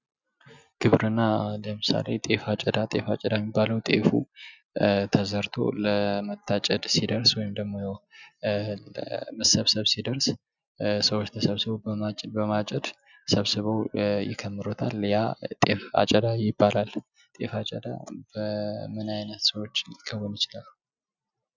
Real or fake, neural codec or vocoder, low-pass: real; none; 7.2 kHz